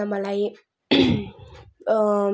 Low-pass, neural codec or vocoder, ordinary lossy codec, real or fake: none; none; none; real